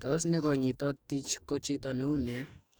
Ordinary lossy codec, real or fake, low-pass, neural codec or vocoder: none; fake; none; codec, 44.1 kHz, 2.6 kbps, DAC